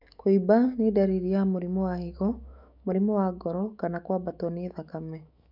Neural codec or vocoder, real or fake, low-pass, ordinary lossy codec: autoencoder, 48 kHz, 128 numbers a frame, DAC-VAE, trained on Japanese speech; fake; 5.4 kHz; none